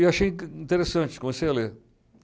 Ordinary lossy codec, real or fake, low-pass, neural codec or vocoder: none; real; none; none